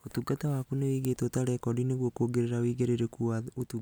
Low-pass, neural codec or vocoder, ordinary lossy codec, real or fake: none; none; none; real